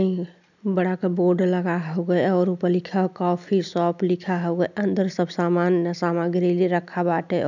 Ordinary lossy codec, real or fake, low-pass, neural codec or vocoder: none; real; 7.2 kHz; none